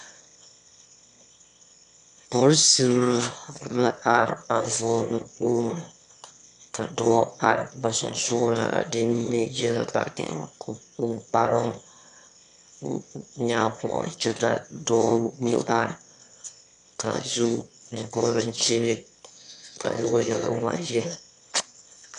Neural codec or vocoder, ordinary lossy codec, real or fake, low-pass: autoencoder, 22.05 kHz, a latent of 192 numbers a frame, VITS, trained on one speaker; MP3, 96 kbps; fake; 9.9 kHz